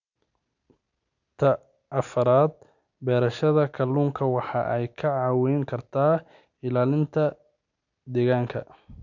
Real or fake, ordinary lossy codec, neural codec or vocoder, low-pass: real; none; none; 7.2 kHz